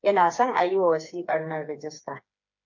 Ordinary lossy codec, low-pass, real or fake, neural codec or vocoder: MP3, 48 kbps; 7.2 kHz; fake; codec, 16 kHz, 4 kbps, FreqCodec, smaller model